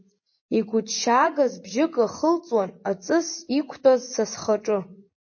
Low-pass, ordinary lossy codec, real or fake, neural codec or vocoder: 7.2 kHz; MP3, 32 kbps; fake; vocoder, 24 kHz, 100 mel bands, Vocos